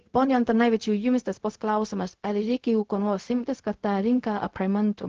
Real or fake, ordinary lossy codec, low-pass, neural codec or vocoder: fake; Opus, 16 kbps; 7.2 kHz; codec, 16 kHz, 0.4 kbps, LongCat-Audio-Codec